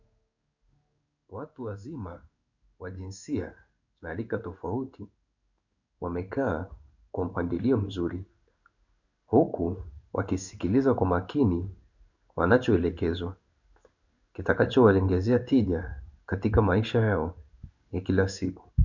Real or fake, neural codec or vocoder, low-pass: fake; codec, 16 kHz in and 24 kHz out, 1 kbps, XY-Tokenizer; 7.2 kHz